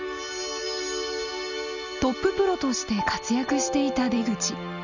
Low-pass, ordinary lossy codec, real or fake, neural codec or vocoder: 7.2 kHz; none; real; none